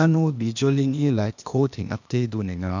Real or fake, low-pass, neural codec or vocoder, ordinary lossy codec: fake; 7.2 kHz; codec, 16 kHz, 0.8 kbps, ZipCodec; none